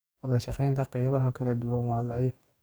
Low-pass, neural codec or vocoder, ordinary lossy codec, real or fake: none; codec, 44.1 kHz, 2.6 kbps, DAC; none; fake